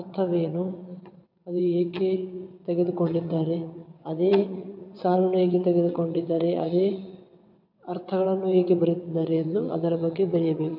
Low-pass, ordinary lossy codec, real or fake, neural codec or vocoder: 5.4 kHz; MP3, 48 kbps; fake; vocoder, 22.05 kHz, 80 mel bands, WaveNeXt